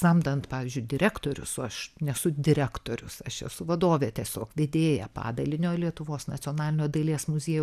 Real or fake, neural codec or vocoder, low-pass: real; none; 14.4 kHz